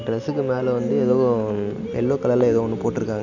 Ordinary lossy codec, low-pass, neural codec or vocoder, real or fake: none; 7.2 kHz; none; real